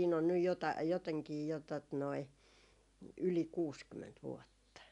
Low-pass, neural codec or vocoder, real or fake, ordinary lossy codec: 10.8 kHz; none; real; none